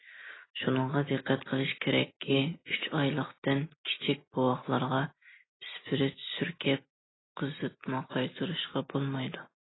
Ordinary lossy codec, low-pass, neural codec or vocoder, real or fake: AAC, 16 kbps; 7.2 kHz; none; real